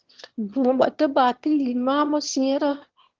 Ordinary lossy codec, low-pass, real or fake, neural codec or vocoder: Opus, 16 kbps; 7.2 kHz; fake; autoencoder, 22.05 kHz, a latent of 192 numbers a frame, VITS, trained on one speaker